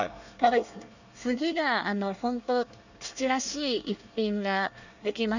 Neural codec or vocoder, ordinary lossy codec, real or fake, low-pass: codec, 24 kHz, 1 kbps, SNAC; none; fake; 7.2 kHz